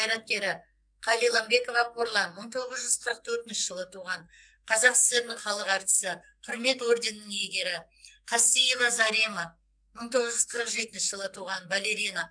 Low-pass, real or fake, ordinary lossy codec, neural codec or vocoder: 9.9 kHz; fake; none; codec, 44.1 kHz, 2.6 kbps, SNAC